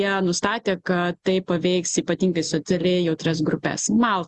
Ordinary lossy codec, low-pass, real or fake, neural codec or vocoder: Opus, 64 kbps; 10.8 kHz; real; none